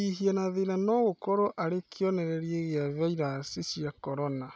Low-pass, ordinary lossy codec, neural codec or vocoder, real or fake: none; none; none; real